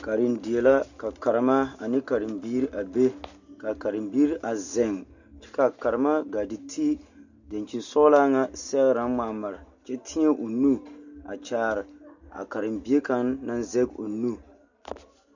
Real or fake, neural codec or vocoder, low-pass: real; none; 7.2 kHz